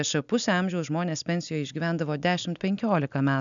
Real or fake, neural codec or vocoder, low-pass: real; none; 7.2 kHz